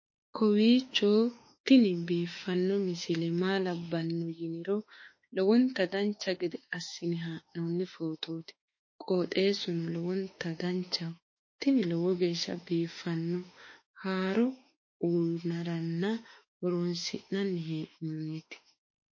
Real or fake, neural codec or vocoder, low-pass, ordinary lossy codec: fake; autoencoder, 48 kHz, 32 numbers a frame, DAC-VAE, trained on Japanese speech; 7.2 kHz; MP3, 32 kbps